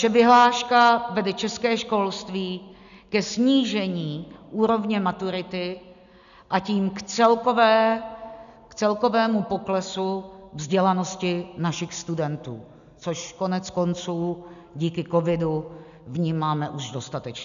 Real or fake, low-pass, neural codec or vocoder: real; 7.2 kHz; none